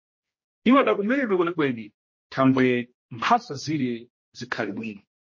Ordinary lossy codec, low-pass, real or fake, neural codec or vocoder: MP3, 32 kbps; 7.2 kHz; fake; codec, 16 kHz, 1 kbps, X-Codec, HuBERT features, trained on general audio